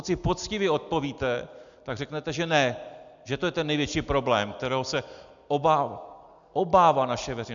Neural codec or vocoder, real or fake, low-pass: none; real; 7.2 kHz